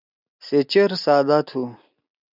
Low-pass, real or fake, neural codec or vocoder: 5.4 kHz; real; none